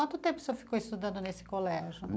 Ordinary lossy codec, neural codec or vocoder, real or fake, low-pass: none; none; real; none